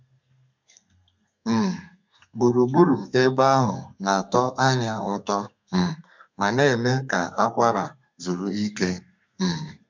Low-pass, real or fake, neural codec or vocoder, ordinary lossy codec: 7.2 kHz; fake; codec, 32 kHz, 1.9 kbps, SNAC; MP3, 64 kbps